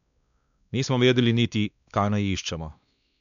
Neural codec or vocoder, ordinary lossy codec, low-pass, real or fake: codec, 16 kHz, 2 kbps, X-Codec, WavLM features, trained on Multilingual LibriSpeech; none; 7.2 kHz; fake